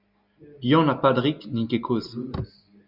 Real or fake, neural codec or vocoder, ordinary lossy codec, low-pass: real; none; Opus, 64 kbps; 5.4 kHz